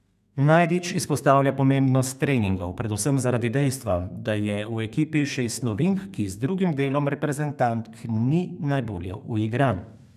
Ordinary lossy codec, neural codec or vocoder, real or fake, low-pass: none; codec, 32 kHz, 1.9 kbps, SNAC; fake; 14.4 kHz